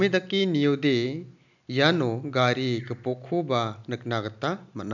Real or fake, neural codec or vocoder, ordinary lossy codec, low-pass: real; none; none; 7.2 kHz